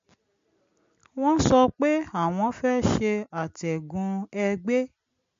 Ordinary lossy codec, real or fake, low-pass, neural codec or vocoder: MP3, 48 kbps; real; 7.2 kHz; none